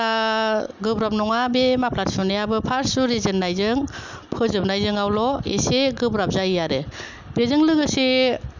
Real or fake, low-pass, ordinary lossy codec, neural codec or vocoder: real; 7.2 kHz; none; none